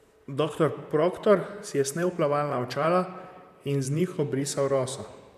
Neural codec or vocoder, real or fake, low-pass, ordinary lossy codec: vocoder, 44.1 kHz, 128 mel bands, Pupu-Vocoder; fake; 14.4 kHz; none